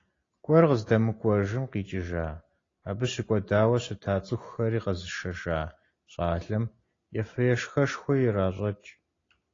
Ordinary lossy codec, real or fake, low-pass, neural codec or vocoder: AAC, 32 kbps; real; 7.2 kHz; none